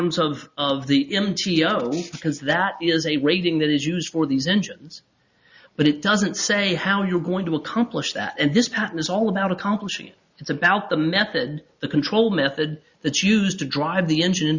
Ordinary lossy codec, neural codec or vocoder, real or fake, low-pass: Opus, 64 kbps; none; real; 7.2 kHz